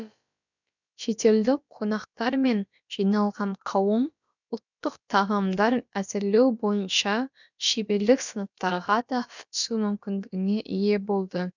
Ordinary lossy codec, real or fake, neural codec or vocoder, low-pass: none; fake; codec, 16 kHz, about 1 kbps, DyCAST, with the encoder's durations; 7.2 kHz